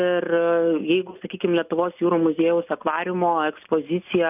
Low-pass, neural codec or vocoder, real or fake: 3.6 kHz; none; real